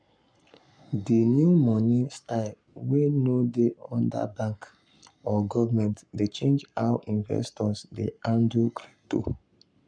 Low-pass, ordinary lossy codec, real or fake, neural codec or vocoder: 9.9 kHz; none; fake; codec, 44.1 kHz, 7.8 kbps, Pupu-Codec